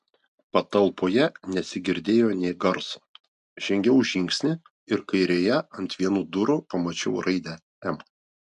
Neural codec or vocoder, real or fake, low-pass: none; real; 10.8 kHz